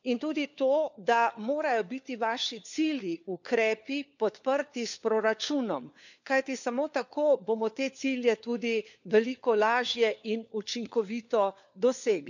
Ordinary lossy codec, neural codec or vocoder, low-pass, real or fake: none; codec, 16 kHz, 4 kbps, FunCodec, trained on LibriTTS, 50 frames a second; 7.2 kHz; fake